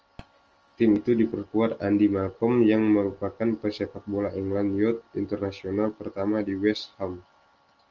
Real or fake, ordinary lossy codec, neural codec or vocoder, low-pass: real; Opus, 24 kbps; none; 7.2 kHz